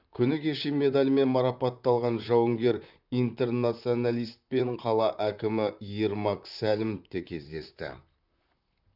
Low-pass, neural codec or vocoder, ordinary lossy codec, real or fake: 5.4 kHz; vocoder, 44.1 kHz, 128 mel bands, Pupu-Vocoder; none; fake